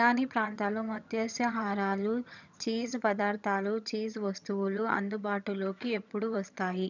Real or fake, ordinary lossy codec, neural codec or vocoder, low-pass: fake; none; vocoder, 22.05 kHz, 80 mel bands, HiFi-GAN; 7.2 kHz